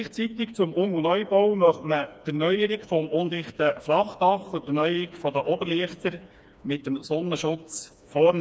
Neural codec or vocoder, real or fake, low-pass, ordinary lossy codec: codec, 16 kHz, 2 kbps, FreqCodec, smaller model; fake; none; none